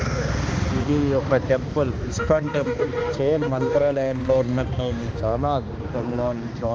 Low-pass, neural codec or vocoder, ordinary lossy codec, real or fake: none; codec, 16 kHz, 2 kbps, X-Codec, HuBERT features, trained on balanced general audio; none; fake